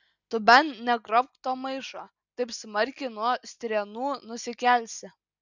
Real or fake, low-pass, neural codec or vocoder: real; 7.2 kHz; none